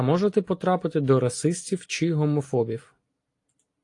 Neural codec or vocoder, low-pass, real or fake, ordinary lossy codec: none; 10.8 kHz; real; AAC, 48 kbps